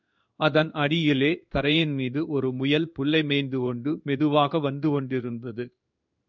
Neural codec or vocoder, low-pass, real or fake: codec, 16 kHz in and 24 kHz out, 1 kbps, XY-Tokenizer; 7.2 kHz; fake